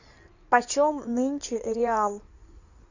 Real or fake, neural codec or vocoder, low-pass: fake; codec, 16 kHz in and 24 kHz out, 2.2 kbps, FireRedTTS-2 codec; 7.2 kHz